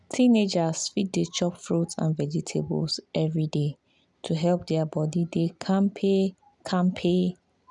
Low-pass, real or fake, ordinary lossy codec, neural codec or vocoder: 10.8 kHz; real; none; none